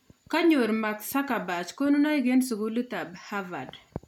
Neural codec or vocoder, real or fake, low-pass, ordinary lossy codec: none; real; 19.8 kHz; none